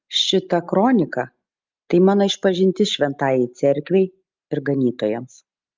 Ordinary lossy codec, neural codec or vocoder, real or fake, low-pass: Opus, 32 kbps; none; real; 7.2 kHz